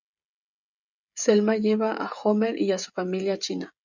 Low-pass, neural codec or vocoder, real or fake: 7.2 kHz; codec, 16 kHz, 16 kbps, FreqCodec, smaller model; fake